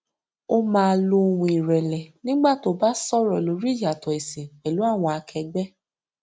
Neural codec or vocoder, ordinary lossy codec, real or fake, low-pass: none; none; real; none